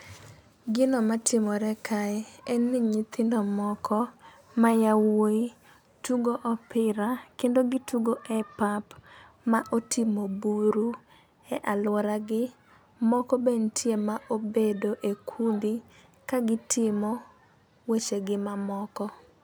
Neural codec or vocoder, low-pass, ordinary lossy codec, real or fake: none; none; none; real